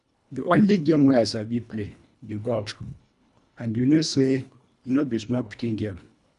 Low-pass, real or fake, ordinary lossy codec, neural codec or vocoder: 10.8 kHz; fake; none; codec, 24 kHz, 1.5 kbps, HILCodec